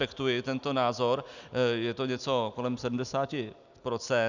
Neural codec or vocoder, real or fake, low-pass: none; real; 7.2 kHz